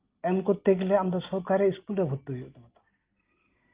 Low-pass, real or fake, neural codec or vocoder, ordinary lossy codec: 3.6 kHz; real; none; Opus, 32 kbps